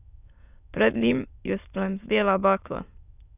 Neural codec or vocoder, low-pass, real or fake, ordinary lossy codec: autoencoder, 22.05 kHz, a latent of 192 numbers a frame, VITS, trained on many speakers; 3.6 kHz; fake; none